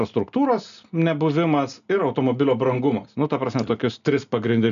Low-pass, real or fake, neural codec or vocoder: 7.2 kHz; real; none